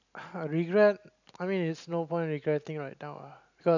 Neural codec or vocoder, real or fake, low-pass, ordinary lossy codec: none; real; 7.2 kHz; none